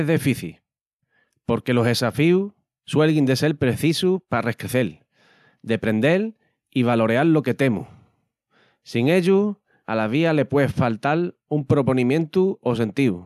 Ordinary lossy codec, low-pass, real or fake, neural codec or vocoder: none; 14.4 kHz; real; none